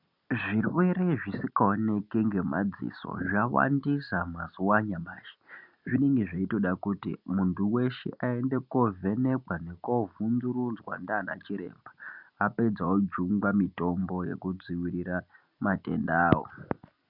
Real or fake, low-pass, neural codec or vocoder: real; 5.4 kHz; none